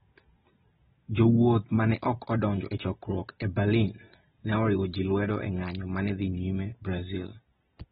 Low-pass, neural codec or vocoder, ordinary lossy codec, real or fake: 7.2 kHz; none; AAC, 16 kbps; real